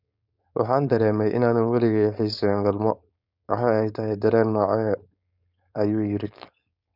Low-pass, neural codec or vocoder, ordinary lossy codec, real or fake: 5.4 kHz; codec, 16 kHz, 4.8 kbps, FACodec; none; fake